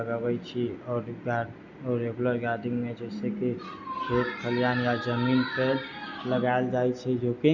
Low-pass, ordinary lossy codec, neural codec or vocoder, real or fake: 7.2 kHz; Opus, 64 kbps; none; real